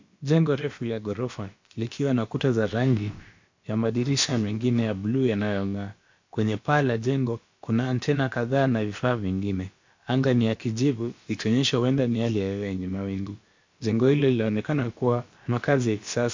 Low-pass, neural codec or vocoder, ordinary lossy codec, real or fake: 7.2 kHz; codec, 16 kHz, about 1 kbps, DyCAST, with the encoder's durations; MP3, 48 kbps; fake